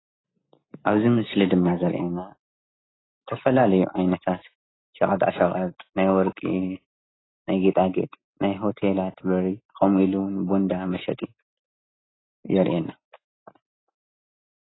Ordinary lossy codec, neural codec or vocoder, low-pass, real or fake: AAC, 16 kbps; none; 7.2 kHz; real